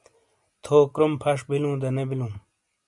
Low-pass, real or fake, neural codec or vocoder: 10.8 kHz; real; none